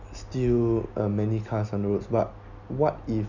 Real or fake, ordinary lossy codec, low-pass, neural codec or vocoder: real; none; 7.2 kHz; none